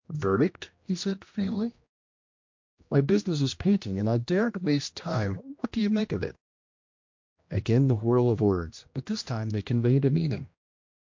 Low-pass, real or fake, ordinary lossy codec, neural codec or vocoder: 7.2 kHz; fake; MP3, 48 kbps; codec, 16 kHz, 1 kbps, X-Codec, HuBERT features, trained on general audio